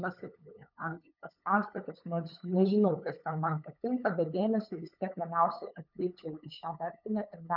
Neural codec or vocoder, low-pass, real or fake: codec, 16 kHz, 8 kbps, FunCodec, trained on LibriTTS, 25 frames a second; 5.4 kHz; fake